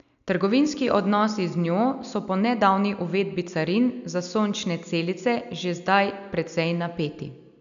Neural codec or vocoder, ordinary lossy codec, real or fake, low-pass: none; none; real; 7.2 kHz